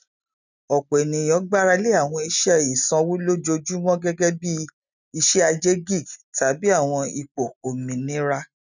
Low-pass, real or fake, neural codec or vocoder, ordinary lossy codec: 7.2 kHz; real; none; none